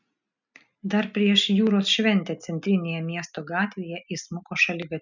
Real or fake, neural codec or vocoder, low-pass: real; none; 7.2 kHz